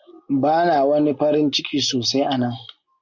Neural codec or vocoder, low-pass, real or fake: none; 7.2 kHz; real